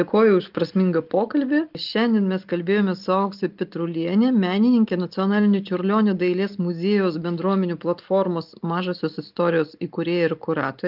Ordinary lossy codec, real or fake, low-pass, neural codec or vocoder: Opus, 24 kbps; real; 5.4 kHz; none